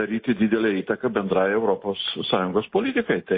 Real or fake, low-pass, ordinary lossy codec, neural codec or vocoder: real; 5.4 kHz; MP3, 24 kbps; none